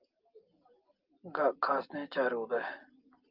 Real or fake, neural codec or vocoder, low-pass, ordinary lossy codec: real; none; 5.4 kHz; Opus, 32 kbps